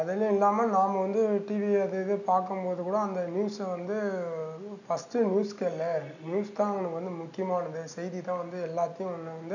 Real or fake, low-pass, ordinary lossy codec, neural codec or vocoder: real; 7.2 kHz; none; none